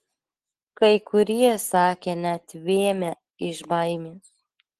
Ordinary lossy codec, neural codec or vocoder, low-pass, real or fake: Opus, 16 kbps; none; 10.8 kHz; real